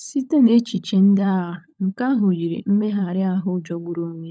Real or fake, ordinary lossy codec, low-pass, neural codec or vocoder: fake; none; none; codec, 16 kHz, 16 kbps, FunCodec, trained on LibriTTS, 50 frames a second